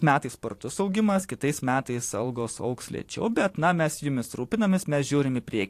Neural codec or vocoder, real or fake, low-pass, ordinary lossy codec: vocoder, 44.1 kHz, 128 mel bands every 512 samples, BigVGAN v2; fake; 14.4 kHz; AAC, 64 kbps